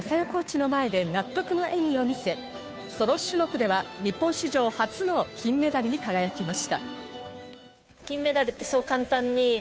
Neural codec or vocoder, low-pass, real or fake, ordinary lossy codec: codec, 16 kHz, 2 kbps, FunCodec, trained on Chinese and English, 25 frames a second; none; fake; none